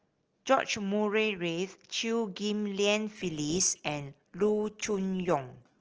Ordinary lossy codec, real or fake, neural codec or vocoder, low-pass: Opus, 16 kbps; real; none; 7.2 kHz